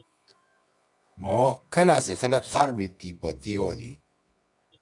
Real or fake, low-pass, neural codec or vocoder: fake; 10.8 kHz; codec, 24 kHz, 0.9 kbps, WavTokenizer, medium music audio release